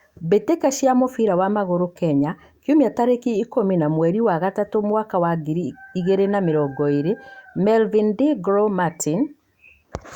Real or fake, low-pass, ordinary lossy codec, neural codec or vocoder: fake; 19.8 kHz; Opus, 64 kbps; autoencoder, 48 kHz, 128 numbers a frame, DAC-VAE, trained on Japanese speech